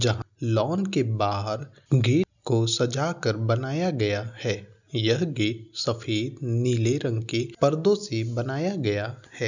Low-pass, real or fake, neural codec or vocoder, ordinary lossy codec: 7.2 kHz; real; none; none